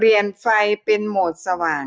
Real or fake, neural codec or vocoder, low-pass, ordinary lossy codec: real; none; none; none